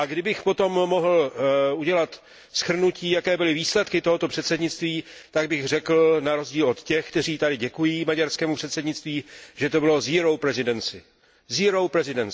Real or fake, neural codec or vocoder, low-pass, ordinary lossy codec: real; none; none; none